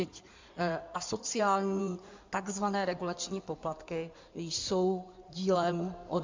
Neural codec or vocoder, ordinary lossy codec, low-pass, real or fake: codec, 16 kHz in and 24 kHz out, 2.2 kbps, FireRedTTS-2 codec; MP3, 64 kbps; 7.2 kHz; fake